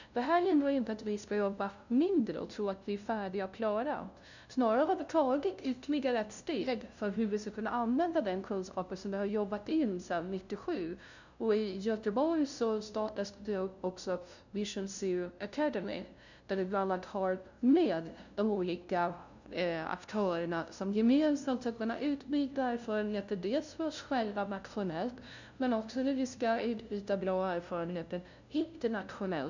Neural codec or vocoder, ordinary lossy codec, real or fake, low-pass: codec, 16 kHz, 0.5 kbps, FunCodec, trained on LibriTTS, 25 frames a second; none; fake; 7.2 kHz